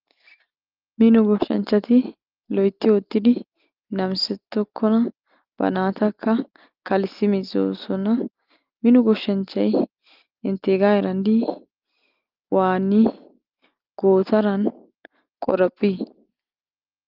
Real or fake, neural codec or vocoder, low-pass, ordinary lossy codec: real; none; 5.4 kHz; Opus, 32 kbps